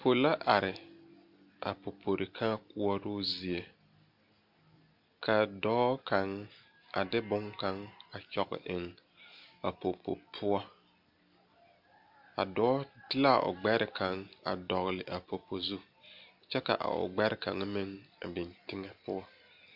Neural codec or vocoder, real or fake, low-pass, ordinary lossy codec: none; real; 5.4 kHz; MP3, 48 kbps